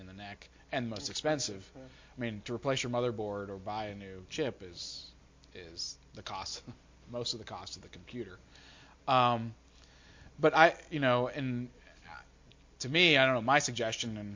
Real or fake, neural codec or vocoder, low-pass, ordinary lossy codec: real; none; 7.2 kHz; MP3, 48 kbps